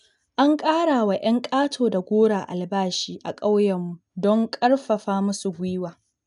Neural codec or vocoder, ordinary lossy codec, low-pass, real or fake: none; none; 10.8 kHz; real